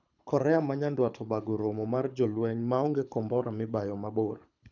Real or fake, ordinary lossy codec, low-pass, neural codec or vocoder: fake; none; 7.2 kHz; codec, 24 kHz, 6 kbps, HILCodec